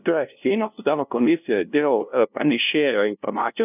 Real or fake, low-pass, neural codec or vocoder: fake; 3.6 kHz; codec, 16 kHz, 0.5 kbps, FunCodec, trained on LibriTTS, 25 frames a second